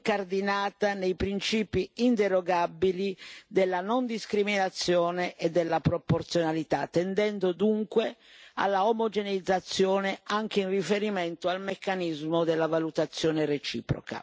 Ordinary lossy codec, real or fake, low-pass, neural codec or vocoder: none; real; none; none